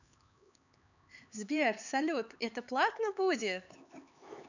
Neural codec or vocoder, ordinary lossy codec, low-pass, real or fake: codec, 16 kHz, 4 kbps, X-Codec, HuBERT features, trained on LibriSpeech; none; 7.2 kHz; fake